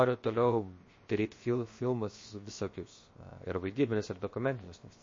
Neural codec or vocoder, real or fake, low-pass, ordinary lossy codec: codec, 16 kHz, 0.7 kbps, FocalCodec; fake; 7.2 kHz; MP3, 32 kbps